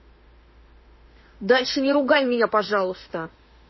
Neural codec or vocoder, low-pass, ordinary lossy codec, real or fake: autoencoder, 48 kHz, 32 numbers a frame, DAC-VAE, trained on Japanese speech; 7.2 kHz; MP3, 24 kbps; fake